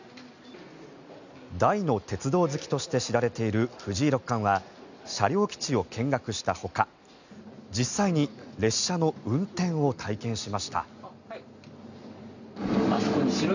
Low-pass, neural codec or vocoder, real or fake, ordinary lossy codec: 7.2 kHz; none; real; none